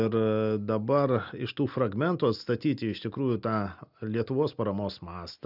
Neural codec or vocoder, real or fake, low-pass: none; real; 5.4 kHz